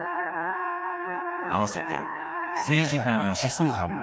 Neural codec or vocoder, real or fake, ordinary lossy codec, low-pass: codec, 16 kHz, 1 kbps, FreqCodec, larger model; fake; none; none